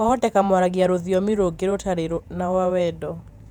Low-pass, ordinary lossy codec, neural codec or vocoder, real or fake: 19.8 kHz; none; vocoder, 48 kHz, 128 mel bands, Vocos; fake